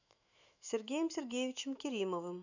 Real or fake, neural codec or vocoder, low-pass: fake; autoencoder, 48 kHz, 128 numbers a frame, DAC-VAE, trained on Japanese speech; 7.2 kHz